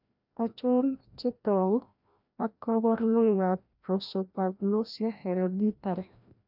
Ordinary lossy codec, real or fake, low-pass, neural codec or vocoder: none; fake; 5.4 kHz; codec, 16 kHz, 1 kbps, FreqCodec, larger model